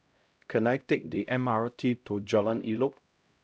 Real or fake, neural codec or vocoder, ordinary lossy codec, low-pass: fake; codec, 16 kHz, 0.5 kbps, X-Codec, HuBERT features, trained on LibriSpeech; none; none